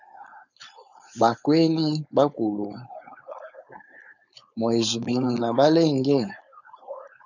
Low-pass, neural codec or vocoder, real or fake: 7.2 kHz; codec, 16 kHz, 4.8 kbps, FACodec; fake